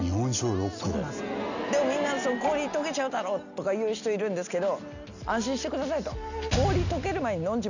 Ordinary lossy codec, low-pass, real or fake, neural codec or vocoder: none; 7.2 kHz; real; none